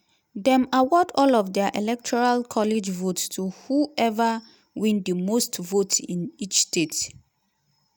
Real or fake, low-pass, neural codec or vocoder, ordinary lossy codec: real; none; none; none